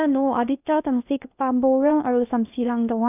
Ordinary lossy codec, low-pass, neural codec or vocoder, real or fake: none; 3.6 kHz; codec, 16 kHz in and 24 kHz out, 0.6 kbps, FocalCodec, streaming, 2048 codes; fake